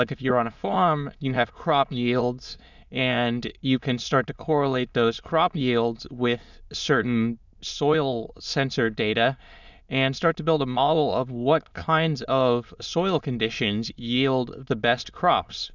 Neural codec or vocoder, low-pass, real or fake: autoencoder, 22.05 kHz, a latent of 192 numbers a frame, VITS, trained on many speakers; 7.2 kHz; fake